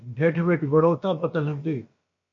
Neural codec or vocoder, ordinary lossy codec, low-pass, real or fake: codec, 16 kHz, about 1 kbps, DyCAST, with the encoder's durations; MP3, 48 kbps; 7.2 kHz; fake